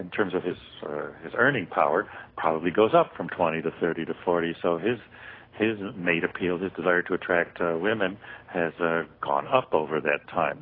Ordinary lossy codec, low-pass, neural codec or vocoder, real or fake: AAC, 24 kbps; 5.4 kHz; none; real